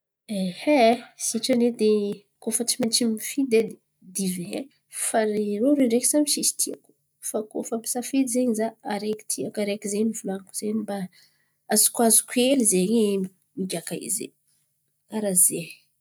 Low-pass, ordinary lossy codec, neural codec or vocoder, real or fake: none; none; none; real